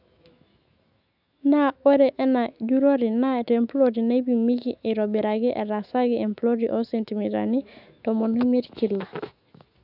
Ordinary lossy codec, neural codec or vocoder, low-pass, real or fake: none; autoencoder, 48 kHz, 128 numbers a frame, DAC-VAE, trained on Japanese speech; 5.4 kHz; fake